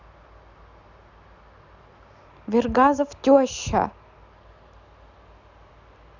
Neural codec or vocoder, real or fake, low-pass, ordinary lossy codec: none; real; 7.2 kHz; none